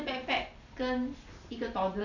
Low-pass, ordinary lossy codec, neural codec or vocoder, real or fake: 7.2 kHz; none; none; real